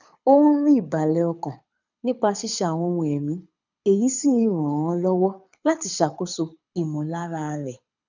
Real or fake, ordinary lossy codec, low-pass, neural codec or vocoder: fake; none; 7.2 kHz; codec, 24 kHz, 6 kbps, HILCodec